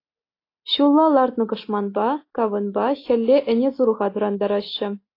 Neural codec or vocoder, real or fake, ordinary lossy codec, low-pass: none; real; AAC, 32 kbps; 5.4 kHz